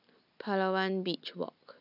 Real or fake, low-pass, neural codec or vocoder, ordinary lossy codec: real; 5.4 kHz; none; none